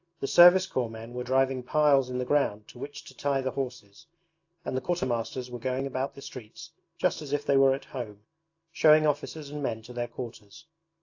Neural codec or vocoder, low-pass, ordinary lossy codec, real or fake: none; 7.2 kHz; AAC, 48 kbps; real